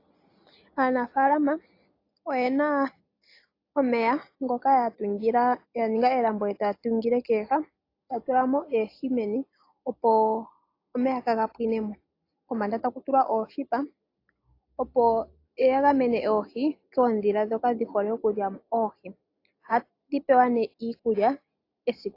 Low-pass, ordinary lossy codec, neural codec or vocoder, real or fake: 5.4 kHz; AAC, 32 kbps; none; real